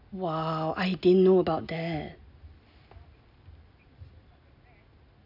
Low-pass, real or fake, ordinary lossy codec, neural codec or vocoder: 5.4 kHz; real; none; none